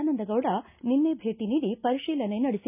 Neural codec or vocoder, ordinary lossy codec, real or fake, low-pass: none; none; real; 3.6 kHz